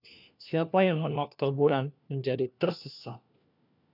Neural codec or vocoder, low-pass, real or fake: codec, 16 kHz, 1 kbps, FunCodec, trained on LibriTTS, 50 frames a second; 5.4 kHz; fake